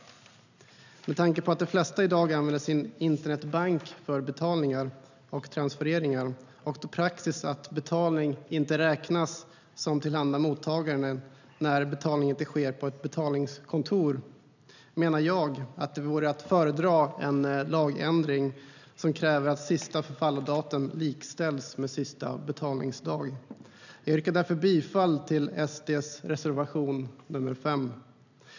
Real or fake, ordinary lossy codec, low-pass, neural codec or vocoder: real; none; 7.2 kHz; none